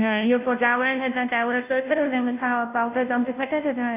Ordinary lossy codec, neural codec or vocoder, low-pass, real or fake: AAC, 32 kbps; codec, 16 kHz, 0.5 kbps, FunCodec, trained on Chinese and English, 25 frames a second; 3.6 kHz; fake